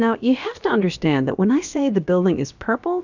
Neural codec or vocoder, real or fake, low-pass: codec, 16 kHz, about 1 kbps, DyCAST, with the encoder's durations; fake; 7.2 kHz